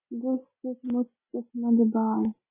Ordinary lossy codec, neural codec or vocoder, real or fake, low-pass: MP3, 16 kbps; none; real; 3.6 kHz